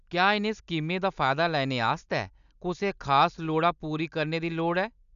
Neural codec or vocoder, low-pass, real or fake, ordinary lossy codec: none; 7.2 kHz; real; none